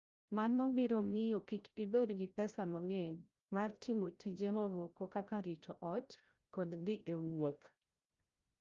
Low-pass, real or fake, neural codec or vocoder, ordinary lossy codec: 7.2 kHz; fake; codec, 16 kHz, 0.5 kbps, FreqCodec, larger model; Opus, 32 kbps